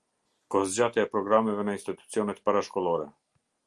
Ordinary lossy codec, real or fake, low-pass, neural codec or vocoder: Opus, 32 kbps; real; 10.8 kHz; none